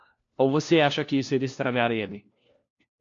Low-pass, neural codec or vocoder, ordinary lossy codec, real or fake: 7.2 kHz; codec, 16 kHz, 1 kbps, FunCodec, trained on LibriTTS, 50 frames a second; AAC, 48 kbps; fake